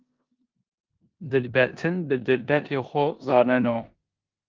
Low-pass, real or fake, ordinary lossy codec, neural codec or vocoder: 7.2 kHz; fake; Opus, 24 kbps; codec, 16 kHz in and 24 kHz out, 0.9 kbps, LongCat-Audio-Codec, four codebook decoder